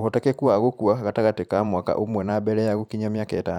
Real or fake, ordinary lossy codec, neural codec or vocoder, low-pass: fake; none; vocoder, 44.1 kHz, 128 mel bands every 512 samples, BigVGAN v2; 19.8 kHz